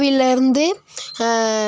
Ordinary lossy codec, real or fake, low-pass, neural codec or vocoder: none; real; none; none